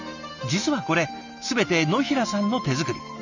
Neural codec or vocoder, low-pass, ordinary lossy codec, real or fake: none; 7.2 kHz; none; real